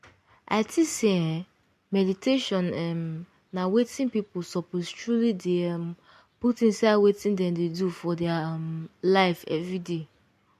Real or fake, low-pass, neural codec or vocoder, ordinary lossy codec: real; 14.4 kHz; none; MP3, 64 kbps